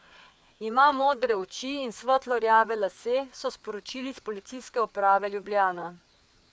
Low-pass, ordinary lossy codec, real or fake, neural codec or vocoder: none; none; fake; codec, 16 kHz, 4 kbps, FreqCodec, larger model